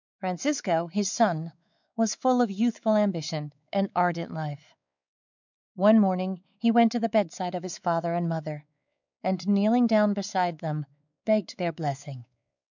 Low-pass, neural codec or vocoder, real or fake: 7.2 kHz; codec, 16 kHz, 4 kbps, X-Codec, WavLM features, trained on Multilingual LibriSpeech; fake